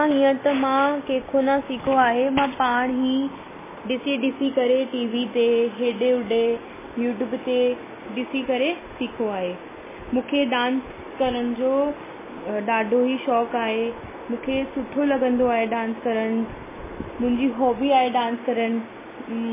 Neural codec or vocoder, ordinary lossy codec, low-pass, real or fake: none; MP3, 16 kbps; 3.6 kHz; real